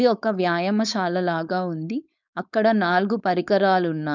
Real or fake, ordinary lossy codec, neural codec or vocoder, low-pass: fake; none; codec, 16 kHz, 4.8 kbps, FACodec; 7.2 kHz